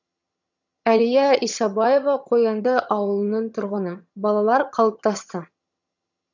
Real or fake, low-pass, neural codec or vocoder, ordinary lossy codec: fake; 7.2 kHz; vocoder, 22.05 kHz, 80 mel bands, HiFi-GAN; none